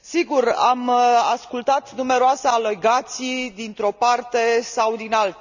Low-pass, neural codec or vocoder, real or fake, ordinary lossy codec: 7.2 kHz; none; real; none